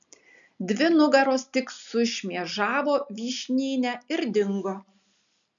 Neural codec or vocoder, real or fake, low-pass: none; real; 7.2 kHz